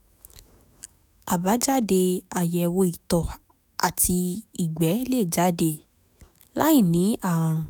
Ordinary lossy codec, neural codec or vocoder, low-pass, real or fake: none; autoencoder, 48 kHz, 128 numbers a frame, DAC-VAE, trained on Japanese speech; none; fake